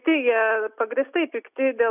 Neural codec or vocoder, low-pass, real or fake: none; 3.6 kHz; real